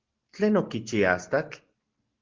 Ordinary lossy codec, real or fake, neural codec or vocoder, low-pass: Opus, 16 kbps; real; none; 7.2 kHz